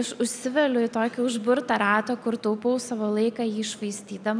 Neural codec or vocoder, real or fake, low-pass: none; real; 9.9 kHz